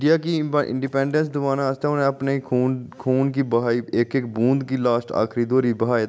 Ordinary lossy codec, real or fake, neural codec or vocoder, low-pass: none; real; none; none